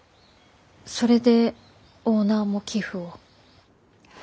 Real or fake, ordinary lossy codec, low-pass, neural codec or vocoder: real; none; none; none